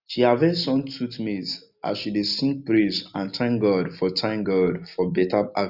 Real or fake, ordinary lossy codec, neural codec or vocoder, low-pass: real; none; none; 5.4 kHz